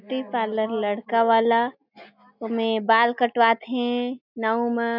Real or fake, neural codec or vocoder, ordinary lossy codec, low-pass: real; none; none; 5.4 kHz